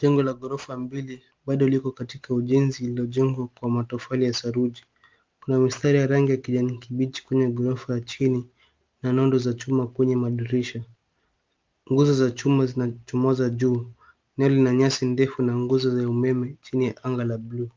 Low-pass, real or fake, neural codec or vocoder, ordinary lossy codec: 7.2 kHz; real; none; Opus, 24 kbps